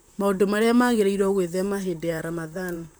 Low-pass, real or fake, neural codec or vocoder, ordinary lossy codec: none; fake; vocoder, 44.1 kHz, 128 mel bands, Pupu-Vocoder; none